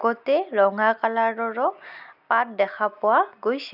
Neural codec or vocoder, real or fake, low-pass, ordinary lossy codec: none; real; 5.4 kHz; none